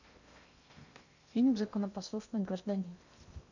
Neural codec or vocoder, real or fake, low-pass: codec, 16 kHz in and 24 kHz out, 0.8 kbps, FocalCodec, streaming, 65536 codes; fake; 7.2 kHz